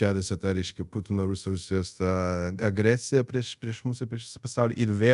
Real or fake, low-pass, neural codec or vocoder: fake; 10.8 kHz; codec, 24 kHz, 0.5 kbps, DualCodec